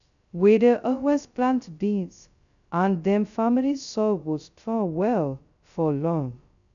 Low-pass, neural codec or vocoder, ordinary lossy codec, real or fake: 7.2 kHz; codec, 16 kHz, 0.2 kbps, FocalCodec; none; fake